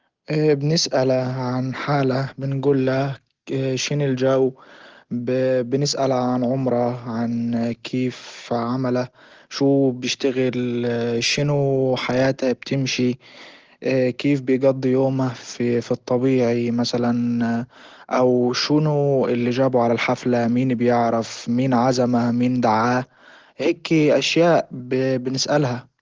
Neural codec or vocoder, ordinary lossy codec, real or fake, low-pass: none; Opus, 16 kbps; real; 7.2 kHz